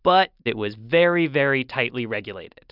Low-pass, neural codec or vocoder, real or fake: 5.4 kHz; none; real